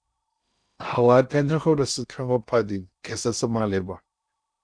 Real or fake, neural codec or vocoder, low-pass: fake; codec, 16 kHz in and 24 kHz out, 0.6 kbps, FocalCodec, streaming, 2048 codes; 9.9 kHz